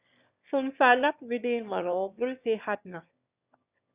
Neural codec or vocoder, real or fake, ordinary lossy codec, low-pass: autoencoder, 22.05 kHz, a latent of 192 numbers a frame, VITS, trained on one speaker; fake; Opus, 64 kbps; 3.6 kHz